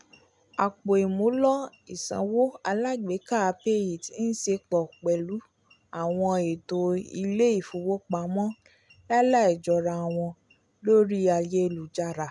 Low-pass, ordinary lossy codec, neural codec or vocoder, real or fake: 10.8 kHz; MP3, 96 kbps; none; real